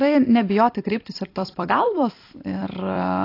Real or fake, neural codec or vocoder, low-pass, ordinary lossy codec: fake; vocoder, 44.1 kHz, 128 mel bands every 256 samples, BigVGAN v2; 5.4 kHz; AAC, 32 kbps